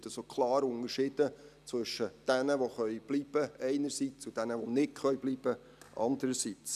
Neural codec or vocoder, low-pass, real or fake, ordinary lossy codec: none; 14.4 kHz; real; none